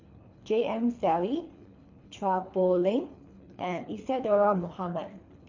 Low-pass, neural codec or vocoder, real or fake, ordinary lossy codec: 7.2 kHz; codec, 24 kHz, 3 kbps, HILCodec; fake; MP3, 48 kbps